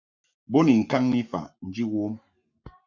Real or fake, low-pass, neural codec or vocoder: fake; 7.2 kHz; codec, 44.1 kHz, 7.8 kbps, Pupu-Codec